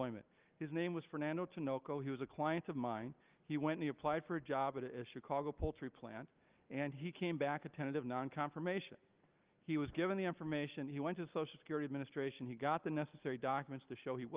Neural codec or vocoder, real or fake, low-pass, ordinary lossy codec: none; real; 3.6 kHz; Opus, 32 kbps